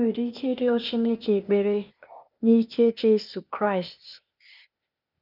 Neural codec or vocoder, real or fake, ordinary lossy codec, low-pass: codec, 16 kHz, 0.8 kbps, ZipCodec; fake; none; 5.4 kHz